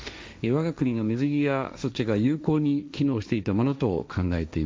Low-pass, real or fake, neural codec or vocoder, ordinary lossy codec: none; fake; codec, 16 kHz, 1.1 kbps, Voila-Tokenizer; none